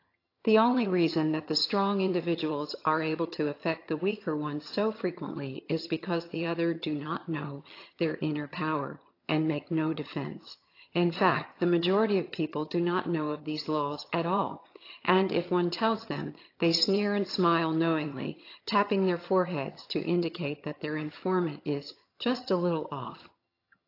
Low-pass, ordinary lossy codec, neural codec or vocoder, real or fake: 5.4 kHz; AAC, 32 kbps; vocoder, 22.05 kHz, 80 mel bands, HiFi-GAN; fake